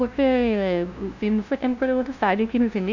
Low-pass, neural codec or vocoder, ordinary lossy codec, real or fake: 7.2 kHz; codec, 16 kHz, 0.5 kbps, FunCodec, trained on LibriTTS, 25 frames a second; none; fake